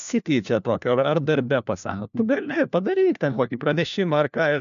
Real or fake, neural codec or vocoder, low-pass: fake; codec, 16 kHz, 1 kbps, FunCodec, trained on LibriTTS, 50 frames a second; 7.2 kHz